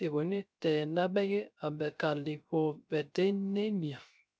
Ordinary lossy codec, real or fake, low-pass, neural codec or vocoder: none; fake; none; codec, 16 kHz, 0.3 kbps, FocalCodec